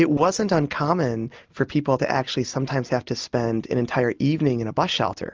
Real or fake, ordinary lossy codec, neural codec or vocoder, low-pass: real; Opus, 24 kbps; none; 7.2 kHz